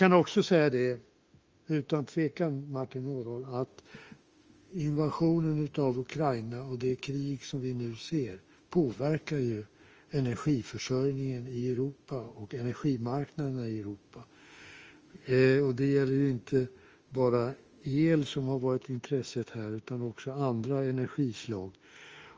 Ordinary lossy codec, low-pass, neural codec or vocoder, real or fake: Opus, 24 kbps; 7.2 kHz; autoencoder, 48 kHz, 32 numbers a frame, DAC-VAE, trained on Japanese speech; fake